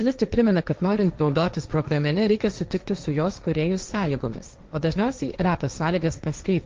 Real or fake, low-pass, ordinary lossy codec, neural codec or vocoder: fake; 7.2 kHz; Opus, 24 kbps; codec, 16 kHz, 1.1 kbps, Voila-Tokenizer